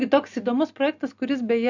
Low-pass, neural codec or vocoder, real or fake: 7.2 kHz; none; real